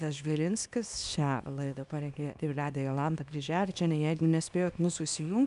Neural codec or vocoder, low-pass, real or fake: codec, 24 kHz, 0.9 kbps, WavTokenizer, small release; 10.8 kHz; fake